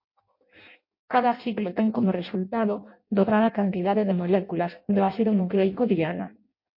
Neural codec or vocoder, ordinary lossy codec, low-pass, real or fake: codec, 16 kHz in and 24 kHz out, 0.6 kbps, FireRedTTS-2 codec; MP3, 32 kbps; 5.4 kHz; fake